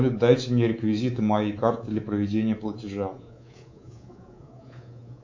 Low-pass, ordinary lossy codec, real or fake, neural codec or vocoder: 7.2 kHz; AAC, 48 kbps; fake; codec, 24 kHz, 3.1 kbps, DualCodec